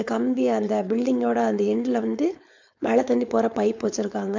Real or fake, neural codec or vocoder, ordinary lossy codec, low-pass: fake; codec, 16 kHz, 4.8 kbps, FACodec; MP3, 64 kbps; 7.2 kHz